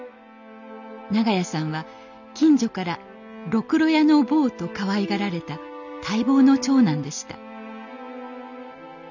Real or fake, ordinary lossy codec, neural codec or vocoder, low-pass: real; none; none; 7.2 kHz